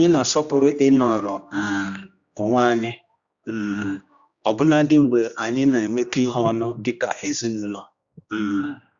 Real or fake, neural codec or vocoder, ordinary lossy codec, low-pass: fake; codec, 16 kHz, 1 kbps, X-Codec, HuBERT features, trained on general audio; Opus, 64 kbps; 7.2 kHz